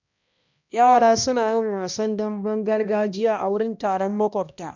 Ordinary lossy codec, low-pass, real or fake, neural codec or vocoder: none; 7.2 kHz; fake; codec, 16 kHz, 1 kbps, X-Codec, HuBERT features, trained on balanced general audio